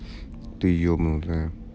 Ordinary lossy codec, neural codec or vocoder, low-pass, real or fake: none; none; none; real